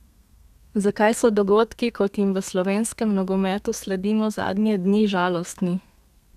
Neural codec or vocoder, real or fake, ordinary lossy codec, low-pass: codec, 32 kHz, 1.9 kbps, SNAC; fake; none; 14.4 kHz